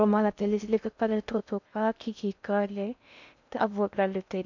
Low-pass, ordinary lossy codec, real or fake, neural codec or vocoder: 7.2 kHz; none; fake; codec, 16 kHz in and 24 kHz out, 0.6 kbps, FocalCodec, streaming, 2048 codes